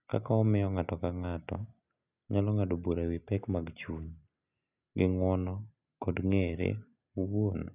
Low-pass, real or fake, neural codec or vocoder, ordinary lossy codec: 3.6 kHz; real; none; none